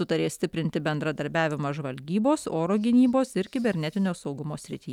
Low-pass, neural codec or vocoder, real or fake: 19.8 kHz; none; real